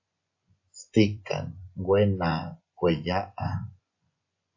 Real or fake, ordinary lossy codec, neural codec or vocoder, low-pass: fake; AAC, 48 kbps; vocoder, 24 kHz, 100 mel bands, Vocos; 7.2 kHz